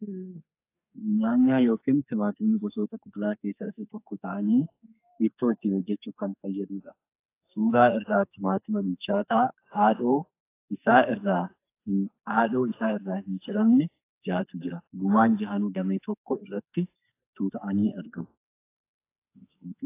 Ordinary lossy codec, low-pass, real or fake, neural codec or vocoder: AAC, 24 kbps; 3.6 kHz; fake; codec, 44.1 kHz, 2.6 kbps, SNAC